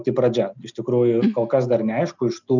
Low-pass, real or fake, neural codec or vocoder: 7.2 kHz; real; none